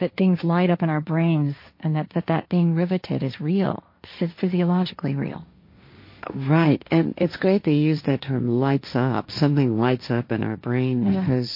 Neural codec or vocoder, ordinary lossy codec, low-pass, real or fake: codec, 16 kHz, 1.1 kbps, Voila-Tokenizer; MP3, 32 kbps; 5.4 kHz; fake